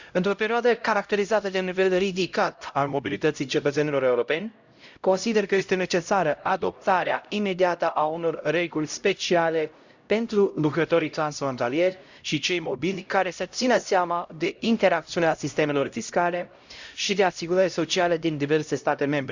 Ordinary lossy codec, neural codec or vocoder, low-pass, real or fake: Opus, 64 kbps; codec, 16 kHz, 0.5 kbps, X-Codec, HuBERT features, trained on LibriSpeech; 7.2 kHz; fake